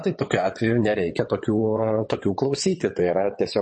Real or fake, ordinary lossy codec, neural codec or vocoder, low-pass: fake; MP3, 32 kbps; vocoder, 22.05 kHz, 80 mel bands, WaveNeXt; 9.9 kHz